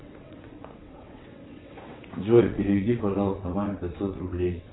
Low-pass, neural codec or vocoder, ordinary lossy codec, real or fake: 7.2 kHz; vocoder, 22.05 kHz, 80 mel bands, WaveNeXt; AAC, 16 kbps; fake